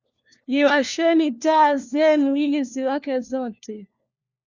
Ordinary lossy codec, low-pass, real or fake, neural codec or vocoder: Opus, 64 kbps; 7.2 kHz; fake; codec, 16 kHz, 1 kbps, FunCodec, trained on LibriTTS, 50 frames a second